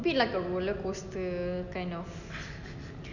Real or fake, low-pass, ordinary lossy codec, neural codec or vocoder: real; 7.2 kHz; none; none